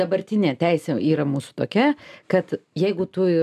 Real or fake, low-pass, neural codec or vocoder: real; 14.4 kHz; none